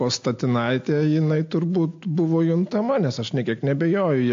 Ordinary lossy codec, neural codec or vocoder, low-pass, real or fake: AAC, 48 kbps; none; 7.2 kHz; real